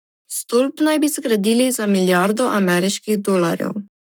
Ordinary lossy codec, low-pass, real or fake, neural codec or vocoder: none; none; fake; codec, 44.1 kHz, 7.8 kbps, Pupu-Codec